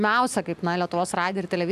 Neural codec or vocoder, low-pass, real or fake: none; 14.4 kHz; real